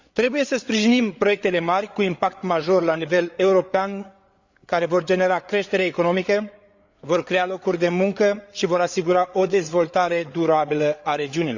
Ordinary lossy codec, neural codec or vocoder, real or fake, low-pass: Opus, 64 kbps; codec, 16 kHz, 8 kbps, FreqCodec, larger model; fake; 7.2 kHz